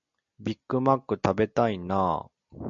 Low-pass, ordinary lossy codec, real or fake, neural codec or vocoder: 7.2 kHz; MP3, 64 kbps; real; none